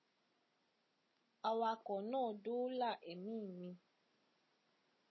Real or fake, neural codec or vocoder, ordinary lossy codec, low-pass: real; none; MP3, 24 kbps; 7.2 kHz